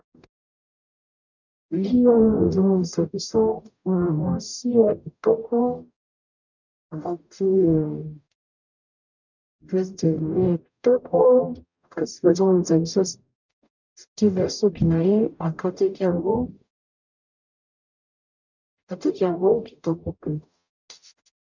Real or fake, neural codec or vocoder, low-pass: fake; codec, 44.1 kHz, 0.9 kbps, DAC; 7.2 kHz